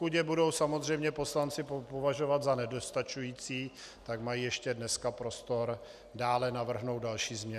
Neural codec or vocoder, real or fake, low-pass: none; real; 14.4 kHz